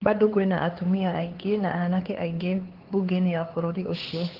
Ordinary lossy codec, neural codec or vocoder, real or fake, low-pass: Opus, 16 kbps; codec, 16 kHz, 4 kbps, X-Codec, HuBERT features, trained on LibriSpeech; fake; 5.4 kHz